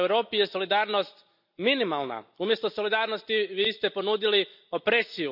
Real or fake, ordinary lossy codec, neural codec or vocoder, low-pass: real; none; none; 5.4 kHz